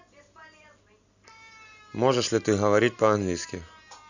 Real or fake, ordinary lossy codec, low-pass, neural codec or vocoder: real; none; 7.2 kHz; none